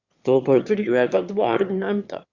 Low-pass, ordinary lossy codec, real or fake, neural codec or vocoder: 7.2 kHz; Opus, 64 kbps; fake; autoencoder, 22.05 kHz, a latent of 192 numbers a frame, VITS, trained on one speaker